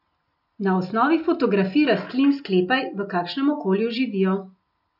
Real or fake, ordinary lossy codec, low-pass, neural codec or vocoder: real; none; 5.4 kHz; none